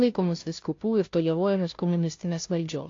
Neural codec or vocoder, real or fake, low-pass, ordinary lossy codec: codec, 16 kHz, 0.5 kbps, FunCodec, trained on Chinese and English, 25 frames a second; fake; 7.2 kHz; AAC, 48 kbps